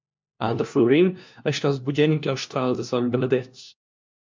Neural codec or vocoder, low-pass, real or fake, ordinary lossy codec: codec, 16 kHz, 1 kbps, FunCodec, trained on LibriTTS, 50 frames a second; 7.2 kHz; fake; MP3, 64 kbps